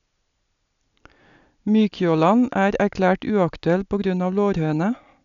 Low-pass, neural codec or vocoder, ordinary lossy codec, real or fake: 7.2 kHz; none; none; real